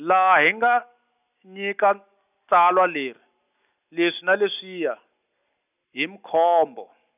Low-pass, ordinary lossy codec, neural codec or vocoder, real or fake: 3.6 kHz; none; none; real